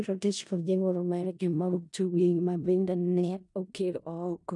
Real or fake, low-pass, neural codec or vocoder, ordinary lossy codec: fake; 10.8 kHz; codec, 16 kHz in and 24 kHz out, 0.4 kbps, LongCat-Audio-Codec, four codebook decoder; none